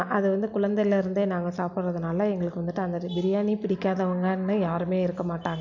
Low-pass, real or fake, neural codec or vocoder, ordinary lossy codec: 7.2 kHz; real; none; none